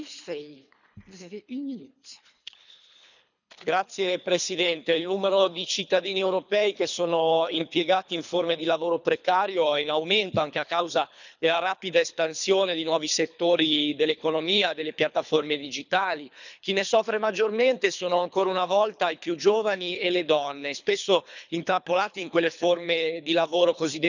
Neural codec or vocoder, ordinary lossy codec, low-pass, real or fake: codec, 24 kHz, 3 kbps, HILCodec; none; 7.2 kHz; fake